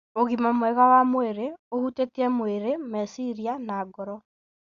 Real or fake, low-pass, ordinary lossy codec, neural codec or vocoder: real; 7.2 kHz; Opus, 64 kbps; none